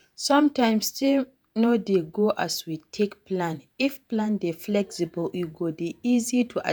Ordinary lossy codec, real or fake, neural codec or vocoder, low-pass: none; fake; vocoder, 48 kHz, 128 mel bands, Vocos; none